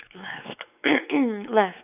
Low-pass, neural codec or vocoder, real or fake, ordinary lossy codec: 3.6 kHz; codec, 16 kHz, 4 kbps, X-Codec, WavLM features, trained on Multilingual LibriSpeech; fake; none